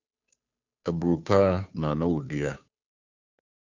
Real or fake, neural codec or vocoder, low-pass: fake; codec, 16 kHz, 2 kbps, FunCodec, trained on Chinese and English, 25 frames a second; 7.2 kHz